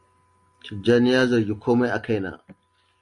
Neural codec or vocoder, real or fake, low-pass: none; real; 10.8 kHz